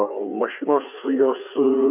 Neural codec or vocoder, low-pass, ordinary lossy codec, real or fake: codec, 16 kHz, 4 kbps, FreqCodec, larger model; 3.6 kHz; MP3, 32 kbps; fake